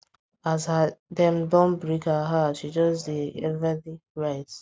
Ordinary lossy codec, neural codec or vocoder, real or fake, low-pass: none; none; real; none